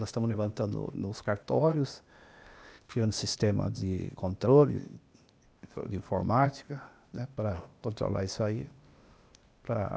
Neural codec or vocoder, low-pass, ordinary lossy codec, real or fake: codec, 16 kHz, 0.8 kbps, ZipCodec; none; none; fake